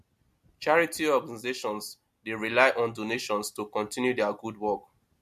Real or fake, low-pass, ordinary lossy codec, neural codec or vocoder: real; 14.4 kHz; MP3, 64 kbps; none